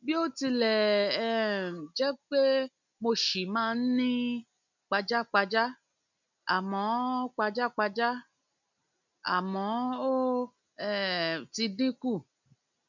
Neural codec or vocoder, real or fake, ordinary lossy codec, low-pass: none; real; none; 7.2 kHz